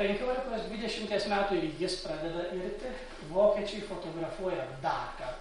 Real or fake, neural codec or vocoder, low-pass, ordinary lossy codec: real; none; 19.8 kHz; MP3, 48 kbps